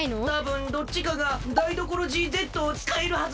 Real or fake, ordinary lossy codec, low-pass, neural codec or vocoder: real; none; none; none